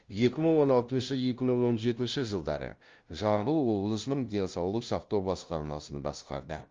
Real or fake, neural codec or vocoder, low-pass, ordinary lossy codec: fake; codec, 16 kHz, 0.5 kbps, FunCodec, trained on LibriTTS, 25 frames a second; 7.2 kHz; Opus, 32 kbps